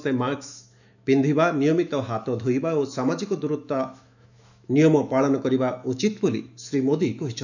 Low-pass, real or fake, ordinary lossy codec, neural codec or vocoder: 7.2 kHz; fake; none; autoencoder, 48 kHz, 128 numbers a frame, DAC-VAE, trained on Japanese speech